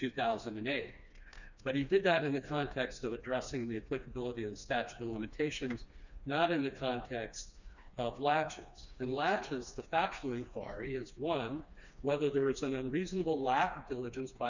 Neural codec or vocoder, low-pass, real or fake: codec, 16 kHz, 2 kbps, FreqCodec, smaller model; 7.2 kHz; fake